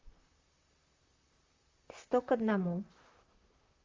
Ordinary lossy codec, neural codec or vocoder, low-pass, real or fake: Opus, 32 kbps; vocoder, 44.1 kHz, 128 mel bands, Pupu-Vocoder; 7.2 kHz; fake